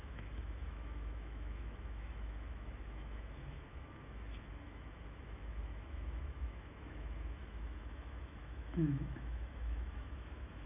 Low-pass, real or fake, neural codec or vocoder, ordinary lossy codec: 3.6 kHz; fake; autoencoder, 48 kHz, 128 numbers a frame, DAC-VAE, trained on Japanese speech; none